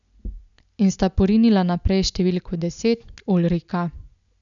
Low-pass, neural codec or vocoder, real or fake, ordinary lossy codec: 7.2 kHz; none; real; none